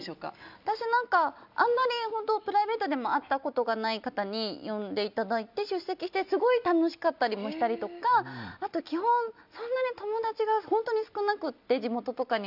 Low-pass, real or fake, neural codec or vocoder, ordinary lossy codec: 5.4 kHz; real; none; none